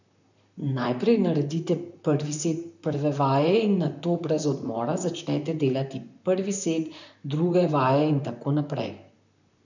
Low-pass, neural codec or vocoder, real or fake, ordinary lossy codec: 7.2 kHz; vocoder, 44.1 kHz, 128 mel bands, Pupu-Vocoder; fake; none